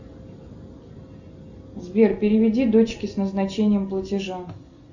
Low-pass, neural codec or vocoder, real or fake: 7.2 kHz; none; real